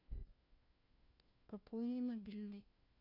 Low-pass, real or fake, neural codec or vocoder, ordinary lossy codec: 5.4 kHz; fake; codec, 16 kHz, 1 kbps, FunCodec, trained on LibriTTS, 50 frames a second; none